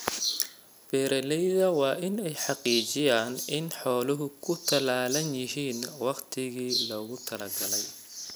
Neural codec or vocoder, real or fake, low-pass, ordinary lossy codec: none; real; none; none